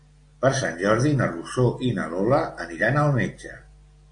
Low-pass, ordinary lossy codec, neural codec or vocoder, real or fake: 9.9 kHz; AAC, 48 kbps; none; real